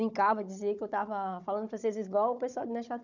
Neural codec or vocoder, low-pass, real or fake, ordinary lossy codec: codec, 16 kHz, 16 kbps, FunCodec, trained on Chinese and English, 50 frames a second; 7.2 kHz; fake; none